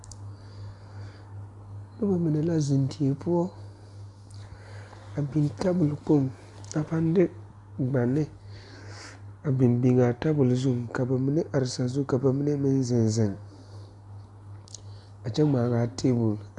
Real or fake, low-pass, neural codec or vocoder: real; 10.8 kHz; none